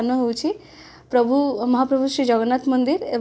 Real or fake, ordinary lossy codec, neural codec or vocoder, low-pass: real; none; none; none